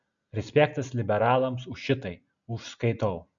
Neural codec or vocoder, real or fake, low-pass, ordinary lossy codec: none; real; 7.2 kHz; MP3, 96 kbps